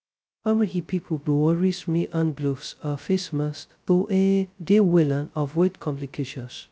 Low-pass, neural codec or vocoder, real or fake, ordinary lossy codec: none; codec, 16 kHz, 0.2 kbps, FocalCodec; fake; none